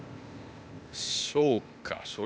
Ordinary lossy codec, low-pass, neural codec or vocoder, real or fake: none; none; codec, 16 kHz, 0.8 kbps, ZipCodec; fake